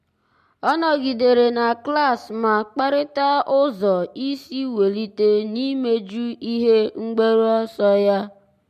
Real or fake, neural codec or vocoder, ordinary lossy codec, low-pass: real; none; MP3, 64 kbps; 14.4 kHz